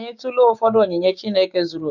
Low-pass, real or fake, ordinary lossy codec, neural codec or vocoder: 7.2 kHz; fake; none; codec, 16 kHz, 6 kbps, DAC